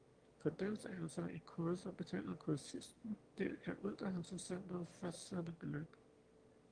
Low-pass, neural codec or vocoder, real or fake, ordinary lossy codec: 9.9 kHz; autoencoder, 22.05 kHz, a latent of 192 numbers a frame, VITS, trained on one speaker; fake; Opus, 24 kbps